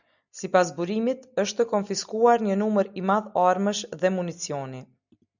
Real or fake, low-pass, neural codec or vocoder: real; 7.2 kHz; none